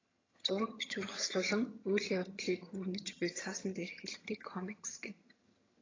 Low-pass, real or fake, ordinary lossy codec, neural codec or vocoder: 7.2 kHz; fake; AAC, 32 kbps; vocoder, 22.05 kHz, 80 mel bands, HiFi-GAN